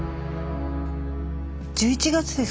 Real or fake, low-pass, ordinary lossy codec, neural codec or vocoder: real; none; none; none